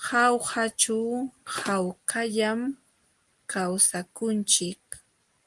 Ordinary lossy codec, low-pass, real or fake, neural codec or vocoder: Opus, 24 kbps; 10.8 kHz; real; none